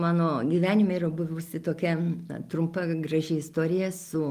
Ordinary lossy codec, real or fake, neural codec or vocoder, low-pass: Opus, 32 kbps; real; none; 14.4 kHz